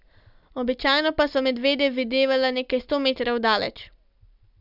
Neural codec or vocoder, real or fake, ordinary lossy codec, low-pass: none; real; none; 5.4 kHz